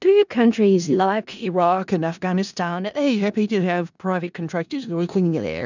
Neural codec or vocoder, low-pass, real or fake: codec, 16 kHz in and 24 kHz out, 0.4 kbps, LongCat-Audio-Codec, four codebook decoder; 7.2 kHz; fake